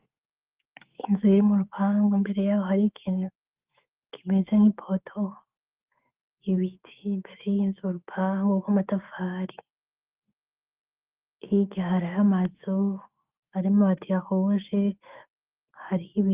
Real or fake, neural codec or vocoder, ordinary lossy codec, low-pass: fake; autoencoder, 48 kHz, 128 numbers a frame, DAC-VAE, trained on Japanese speech; Opus, 32 kbps; 3.6 kHz